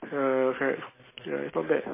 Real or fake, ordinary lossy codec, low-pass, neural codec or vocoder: real; MP3, 16 kbps; 3.6 kHz; none